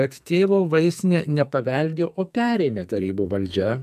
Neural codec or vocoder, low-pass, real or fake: codec, 44.1 kHz, 2.6 kbps, SNAC; 14.4 kHz; fake